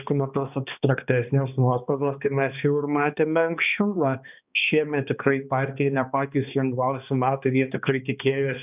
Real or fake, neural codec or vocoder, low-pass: fake; codec, 16 kHz, 2 kbps, X-Codec, HuBERT features, trained on balanced general audio; 3.6 kHz